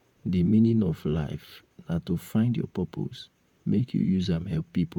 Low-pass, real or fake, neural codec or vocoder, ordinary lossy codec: 19.8 kHz; fake; vocoder, 44.1 kHz, 128 mel bands, Pupu-Vocoder; MP3, 96 kbps